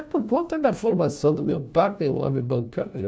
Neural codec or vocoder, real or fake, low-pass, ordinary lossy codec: codec, 16 kHz, 1 kbps, FunCodec, trained on LibriTTS, 50 frames a second; fake; none; none